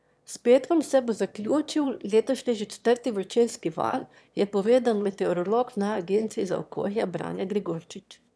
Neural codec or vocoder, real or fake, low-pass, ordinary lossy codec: autoencoder, 22.05 kHz, a latent of 192 numbers a frame, VITS, trained on one speaker; fake; none; none